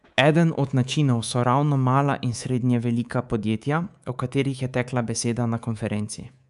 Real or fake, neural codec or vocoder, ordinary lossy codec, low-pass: fake; codec, 24 kHz, 3.1 kbps, DualCodec; none; 10.8 kHz